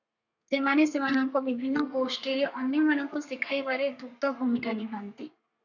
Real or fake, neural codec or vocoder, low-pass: fake; codec, 32 kHz, 1.9 kbps, SNAC; 7.2 kHz